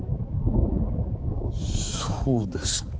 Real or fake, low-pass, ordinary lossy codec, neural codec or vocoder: fake; none; none; codec, 16 kHz, 4 kbps, X-Codec, HuBERT features, trained on balanced general audio